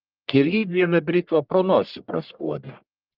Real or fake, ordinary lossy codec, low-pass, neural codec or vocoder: fake; Opus, 24 kbps; 5.4 kHz; codec, 44.1 kHz, 1.7 kbps, Pupu-Codec